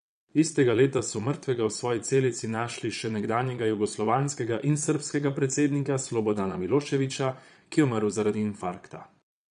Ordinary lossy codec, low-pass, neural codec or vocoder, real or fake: none; 9.9 kHz; vocoder, 22.05 kHz, 80 mel bands, Vocos; fake